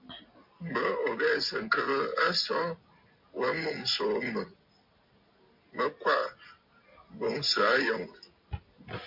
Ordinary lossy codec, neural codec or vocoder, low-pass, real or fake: MP3, 48 kbps; none; 5.4 kHz; real